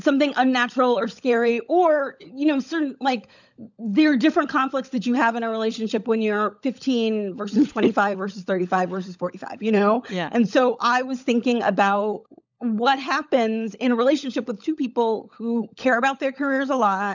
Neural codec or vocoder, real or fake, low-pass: codec, 16 kHz, 16 kbps, FunCodec, trained on LibriTTS, 50 frames a second; fake; 7.2 kHz